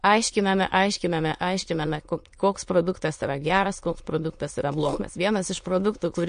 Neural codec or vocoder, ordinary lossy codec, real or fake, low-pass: autoencoder, 22.05 kHz, a latent of 192 numbers a frame, VITS, trained on many speakers; MP3, 48 kbps; fake; 9.9 kHz